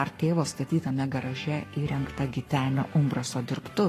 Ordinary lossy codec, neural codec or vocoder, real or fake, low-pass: AAC, 48 kbps; codec, 44.1 kHz, 7.8 kbps, Pupu-Codec; fake; 14.4 kHz